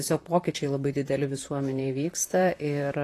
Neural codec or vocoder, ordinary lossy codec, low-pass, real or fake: vocoder, 44.1 kHz, 128 mel bands, Pupu-Vocoder; AAC, 48 kbps; 14.4 kHz; fake